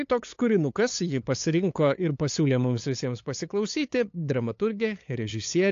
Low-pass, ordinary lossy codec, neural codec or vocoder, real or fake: 7.2 kHz; AAC, 48 kbps; codec, 16 kHz, 8 kbps, FunCodec, trained on LibriTTS, 25 frames a second; fake